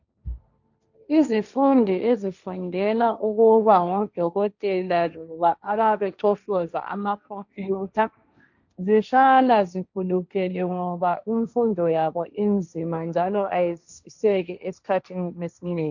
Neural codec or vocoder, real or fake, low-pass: codec, 16 kHz, 1.1 kbps, Voila-Tokenizer; fake; 7.2 kHz